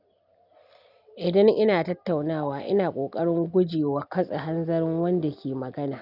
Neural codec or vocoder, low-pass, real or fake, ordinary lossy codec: none; 5.4 kHz; real; none